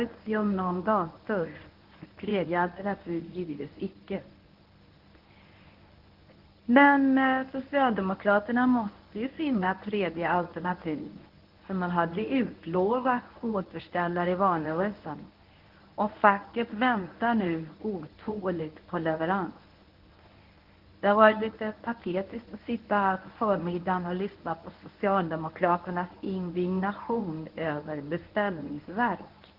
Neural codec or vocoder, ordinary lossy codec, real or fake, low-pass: codec, 24 kHz, 0.9 kbps, WavTokenizer, medium speech release version 1; Opus, 16 kbps; fake; 5.4 kHz